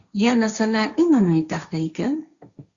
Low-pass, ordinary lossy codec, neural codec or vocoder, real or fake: 7.2 kHz; Opus, 64 kbps; codec, 16 kHz, 1.1 kbps, Voila-Tokenizer; fake